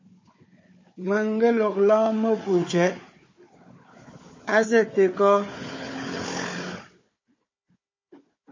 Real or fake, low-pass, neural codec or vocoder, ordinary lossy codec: fake; 7.2 kHz; codec, 16 kHz, 4 kbps, FunCodec, trained on Chinese and English, 50 frames a second; MP3, 32 kbps